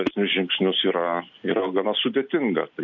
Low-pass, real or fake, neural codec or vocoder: 7.2 kHz; real; none